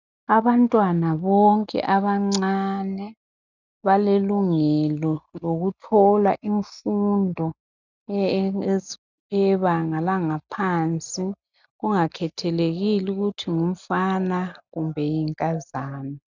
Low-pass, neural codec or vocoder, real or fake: 7.2 kHz; none; real